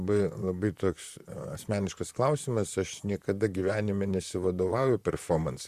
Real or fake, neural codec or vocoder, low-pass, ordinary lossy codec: fake; vocoder, 44.1 kHz, 128 mel bands, Pupu-Vocoder; 14.4 kHz; Opus, 64 kbps